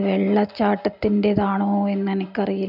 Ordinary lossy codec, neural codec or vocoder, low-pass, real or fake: none; none; 5.4 kHz; real